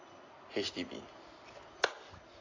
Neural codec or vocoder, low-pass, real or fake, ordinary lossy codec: none; 7.2 kHz; real; AAC, 32 kbps